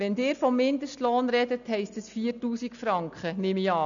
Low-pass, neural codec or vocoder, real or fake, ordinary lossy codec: 7.2 kHz; none; real; none